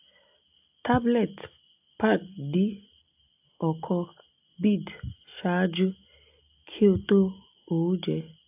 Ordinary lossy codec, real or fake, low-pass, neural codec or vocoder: AAC, 32 kbps; real; 3.6 kHz; none